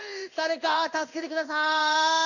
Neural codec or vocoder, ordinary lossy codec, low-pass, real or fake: codec, 16 kHz in and 24 kHz out, 1 kbps, XY-Tokenizer; none; 7.2 kHz; fake